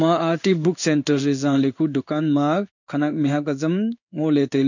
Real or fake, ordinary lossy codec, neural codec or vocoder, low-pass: fake; none; codec, 16 kHz in and 24 kHz out, 1 kbps, XY-Tokenizer; 7.2 kHz